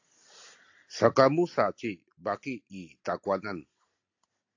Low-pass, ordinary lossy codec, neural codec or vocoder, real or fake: 7.2 kHz; AAC, 48 kbps; none; real